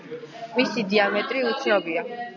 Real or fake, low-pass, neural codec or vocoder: real; 7.2 kHz; none